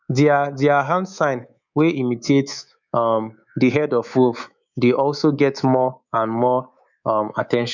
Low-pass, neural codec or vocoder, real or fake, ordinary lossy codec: 7.2 kHz; codec, 24 kHz, 3.1 kbps, DualCodec; fake; none